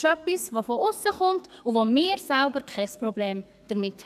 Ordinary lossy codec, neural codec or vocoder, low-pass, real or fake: none; codec, 44.1 kHz, 2.6 kbps, SNAC; 14.4 kHz; fake